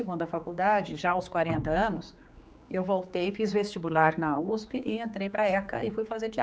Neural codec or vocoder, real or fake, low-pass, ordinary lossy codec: codec, 16 kHz, 4 kbps, X-Codec, HuBERT features, trained on general audio; fake; none; none